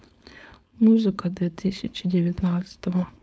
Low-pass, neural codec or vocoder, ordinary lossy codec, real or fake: none; codec, 16 kHz, 4.8 kbps, FACodec; none; fake